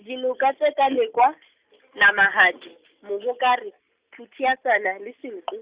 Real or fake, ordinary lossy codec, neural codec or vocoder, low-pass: real; Opus, 64 kbps; none; 3.6 kHz